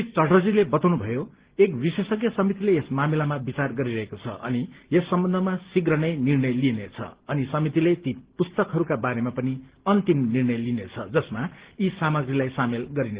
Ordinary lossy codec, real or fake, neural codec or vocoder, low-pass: Opus, 16 kbps; real; none; 3.6 kHz